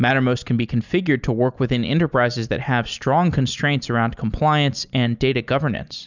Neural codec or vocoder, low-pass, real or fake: none; 7.2 kHz; real